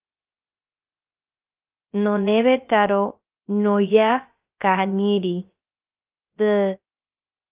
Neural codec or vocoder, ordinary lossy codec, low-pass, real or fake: codec, 16 kHz, 0.3 kbps, FocalCodec; Opus, 24 kbps; 3.6 kHz; fake